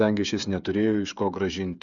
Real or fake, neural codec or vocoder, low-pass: fake; codec, 16 kHz, 8 kbps, FreqCodec, smaller model; 7.2 kHz